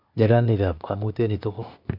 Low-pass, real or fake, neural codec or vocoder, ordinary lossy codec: 5.4 kHz; fake; codec, 16 kHz, 0.8 kbps, ZipCodec; none